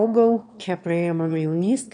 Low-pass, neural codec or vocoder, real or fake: 9.9 kHz; autoencoder, 22.05 kHz, a latent of 192 numbers a frame, VITS, trained on one speaker; fake